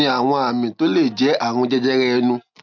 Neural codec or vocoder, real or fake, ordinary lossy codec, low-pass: none; real; none; 7.2 kHz